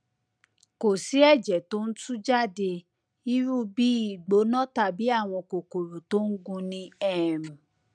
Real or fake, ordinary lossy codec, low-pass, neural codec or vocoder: real; none; 9.9 kHz; none